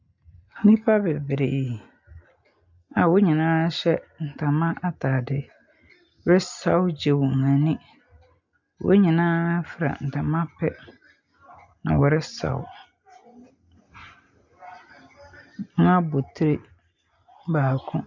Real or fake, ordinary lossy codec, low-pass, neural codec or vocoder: real; MP3, 64 kbps; 7.2 kHz; none